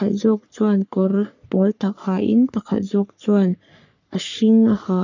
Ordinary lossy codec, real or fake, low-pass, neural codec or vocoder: none; fake; 7.2 kHz; codec, 44.1 kHz, 3.4 kbps, Pupu-Codec